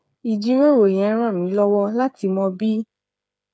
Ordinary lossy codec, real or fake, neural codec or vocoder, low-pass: none; fake; codec, 16 kHz, 8 kbps, FreqCodec, smaller model; none